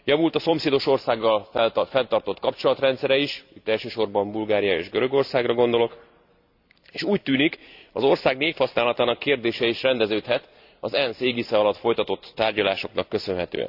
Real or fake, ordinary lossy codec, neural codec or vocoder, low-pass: fake; AAC, 48 kbps; vocoder, 44.1 kHz, 128 mel bands every 256 samples, BigVGAN v2; 5.4 kHz